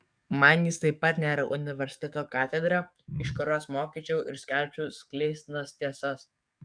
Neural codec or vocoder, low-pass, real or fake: codec, 44.1 kHz, 7.8 kbps, DAC; 9.9 kHz; fake